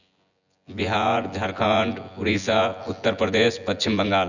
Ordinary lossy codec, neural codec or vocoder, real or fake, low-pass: none; vocoder, 24 kHz, 100 mel bands, Vocos; fake; 7.2 kHz